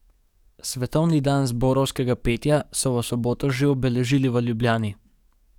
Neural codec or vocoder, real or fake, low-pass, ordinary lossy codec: codec, 44.1 kHz, 7.8 kbps, DAC; fake; 19.8 kHz; none